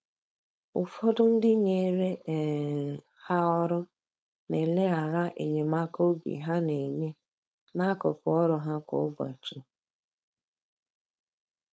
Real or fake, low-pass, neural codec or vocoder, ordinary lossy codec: fake; none; codec, 16 kHz, 4.8 kbps, FACodec; none